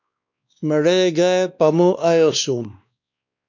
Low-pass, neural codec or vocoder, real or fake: 7.2 kHz; codec, 16 kHz, 2 kbps, X-Codec, WavLM features, trained on Multilingual LibriSpeech; fake